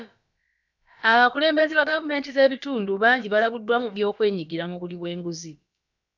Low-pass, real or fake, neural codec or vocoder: 7.2 kHz; fake; codec, 16 kHz, about 1 kbps, DyCAST, with the encoder's durations